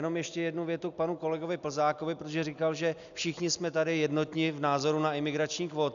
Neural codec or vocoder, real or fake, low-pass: none; real; 7.2 kHz